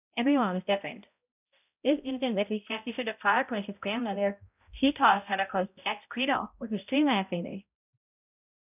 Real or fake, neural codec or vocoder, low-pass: fake; codec, 16 kHz, 0.5 kbps, X-Codec, HuBERT features, trained on balanced general audio; 3.6 kHz